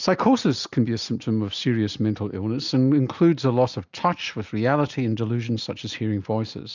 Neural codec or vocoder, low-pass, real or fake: none; 7.2 kHz; real